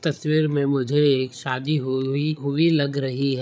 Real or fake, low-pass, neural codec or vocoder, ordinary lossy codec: fake; none; codec, 16 kHz, 8 kbps, FreqCodec, larger model; none